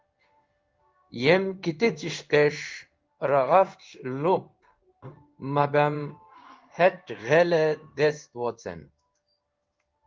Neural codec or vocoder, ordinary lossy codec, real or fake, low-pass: codec, 16 kHz in and 24 kHz out, 1 kbps, XY-Tokenizer; Opus, 32 kbps; fake; 7.2 kHz